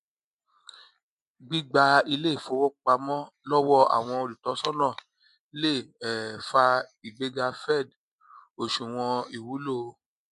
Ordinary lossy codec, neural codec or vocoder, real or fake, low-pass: MP3, 64 kbps; none; real; 10.8 kHz